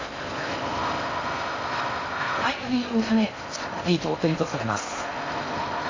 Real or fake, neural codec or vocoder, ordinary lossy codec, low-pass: fake; codec, 16 kHz in and 24 kHz out, 0.6 kbps, FocalCodec, streaming, 4096 codes; AAC, 32 kbps; 7.2 kHz